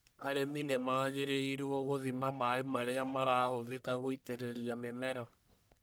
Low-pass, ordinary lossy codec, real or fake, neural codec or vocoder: none; none; fake; codec, 44.1 kHz, 1.7 kbps, Pupu-Codec